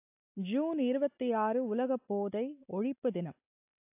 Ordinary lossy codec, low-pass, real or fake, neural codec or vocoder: AAC, 32 kbps; 3.6 kHz; real; none